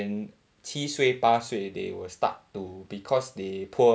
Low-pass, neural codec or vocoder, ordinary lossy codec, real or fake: none; none; none; real